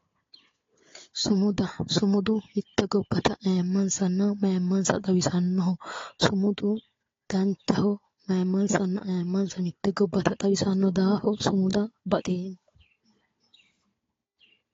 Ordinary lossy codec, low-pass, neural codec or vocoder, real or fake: AAC, 32 kbps; 7.2 kHz; codec, 16 kHz, 4 kbps, FunCodec, trained on Chinese and English, 50 frames a second; fake